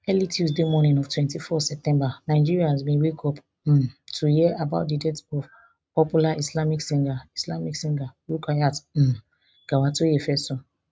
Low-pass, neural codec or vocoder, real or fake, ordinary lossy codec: none; none; real; none